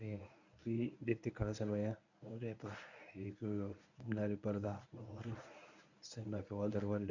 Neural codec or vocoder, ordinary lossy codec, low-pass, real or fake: codec, 24 kHz, 0.9 kbps, WavTokenizer, medium speech release version 1; none; 7.2 kHz; fake